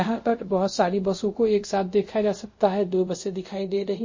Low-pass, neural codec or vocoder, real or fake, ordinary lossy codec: 7.2 kHz; codec, 24 kHz, 0.5 kbps, DualCodec; fake; MP3, 32 kbps